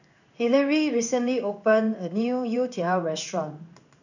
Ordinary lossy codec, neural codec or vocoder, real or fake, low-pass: none; codec, 16 kHz in and 24 kHz out, 1 kbps, XY-Tokenizer; fake; 7.2 kHz